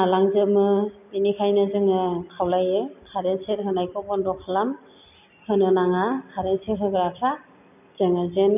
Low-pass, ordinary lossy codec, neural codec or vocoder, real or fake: 3.6 kHz; none; none; real